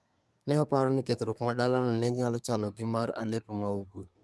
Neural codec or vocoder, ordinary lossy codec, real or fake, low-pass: codec, 24 kHz, 1 kbps, SNAC; none; fake; none